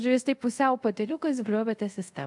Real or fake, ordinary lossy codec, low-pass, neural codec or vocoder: fake; MP3, 64 kbps; 10.8 kHz; codec, 24 kHz, 0.5 kbps, DualCodec